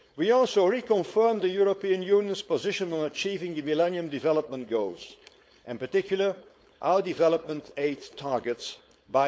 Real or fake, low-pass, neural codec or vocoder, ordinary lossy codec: fake; none; codec, 16 kHz, 4.8 kbps, FACodec; none